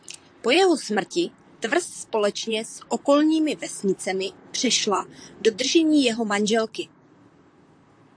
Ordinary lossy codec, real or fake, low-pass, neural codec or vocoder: AAC, 64 kbps; fake; 9.9 kHz; vocoder, 44.1 kHz, 128 mel bands, Pupu-Vocoder